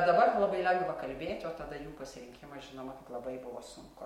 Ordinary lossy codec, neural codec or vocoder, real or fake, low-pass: MP3, 64 kbps; none; real; 19.8 kHz